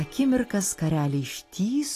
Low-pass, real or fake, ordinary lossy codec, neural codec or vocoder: 14.4 kHz; real; AAC, 48 kbps; none